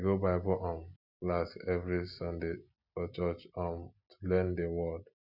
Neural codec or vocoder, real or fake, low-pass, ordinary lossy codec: none; real; 5.4 kHz; none